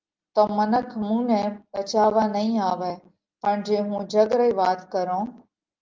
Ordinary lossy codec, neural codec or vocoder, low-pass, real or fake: Opus, 32 kbps; none; 7.2 kHz; real